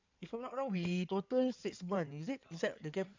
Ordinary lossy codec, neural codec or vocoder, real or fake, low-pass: MP3, 64 kbps; codec, 16 kHz in and 24 kHz out, 2.2 kbps, FireRedTTS-2 codec; fake; 7.2 kHz